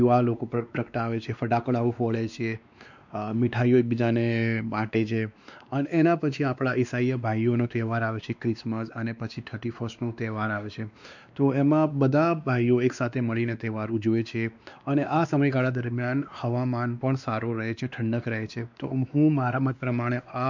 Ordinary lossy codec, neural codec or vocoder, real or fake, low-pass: none; codec, 16 kHz, 2 kbps, X-Codec, WavLM features, trained on Multilingual LibriSpeech; fake; 7.2 kHz